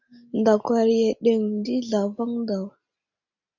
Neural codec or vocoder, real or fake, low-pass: none; real; 7.2 kHz